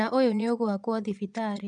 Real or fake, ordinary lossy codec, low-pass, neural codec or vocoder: fake; none; 9.9 kHz; vocoder, 22.05 kHz, 80 mel bands, Vocos